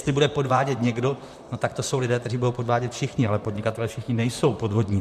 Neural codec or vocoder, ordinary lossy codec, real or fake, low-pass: vocoder, 44.1 kHz, 128 mel bands, Pupu-Vocoder; MP3, 96 kbps; fake; 14.4 kHz